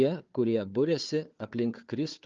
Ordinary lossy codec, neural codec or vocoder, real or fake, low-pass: Opus, 24 kbps; codec, 16 kHz, 4 kbps, FunCodec, trained on Chinese and English, 50 frames a second; fake; 7.2 kHz